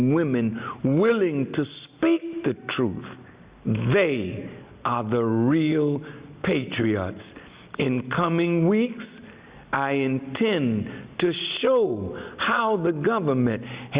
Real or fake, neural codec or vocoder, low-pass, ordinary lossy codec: real; none; 3.6 kHz; Opus, 64 kbps